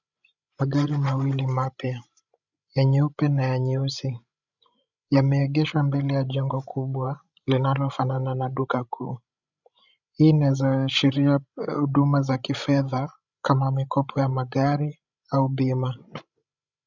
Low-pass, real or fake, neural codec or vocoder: 7.2 kHz; fake; codec, 16 kHz, 16 kbps, FreqCodec, larger model